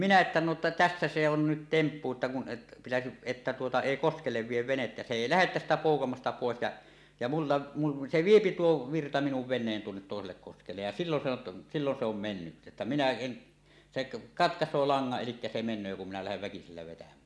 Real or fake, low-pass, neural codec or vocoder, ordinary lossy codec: real; none; none; none